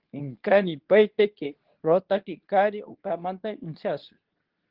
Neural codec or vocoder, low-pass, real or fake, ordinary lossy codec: codec, 24 kHz, 0.9 kbps, WavTokenizer, medium speech release version 2; 5.4 kHz; fake; Opus, 16 kbps